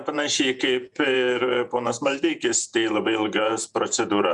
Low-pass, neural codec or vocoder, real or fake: 10.8 kHz; vocoder, 48 kHz, 128 mel bands, Vocos; fake